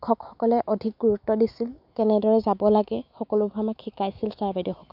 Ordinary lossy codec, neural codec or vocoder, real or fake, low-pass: none; autoencoder, 48 kHz, 128 numbers a frame, DAC-VAE, trained on Japanese speech; fake; 5.4 kHz